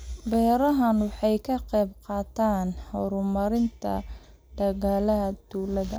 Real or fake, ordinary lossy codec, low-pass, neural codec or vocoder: real; none; none; none